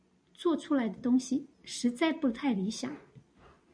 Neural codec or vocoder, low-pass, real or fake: none; 9.9 kHz; real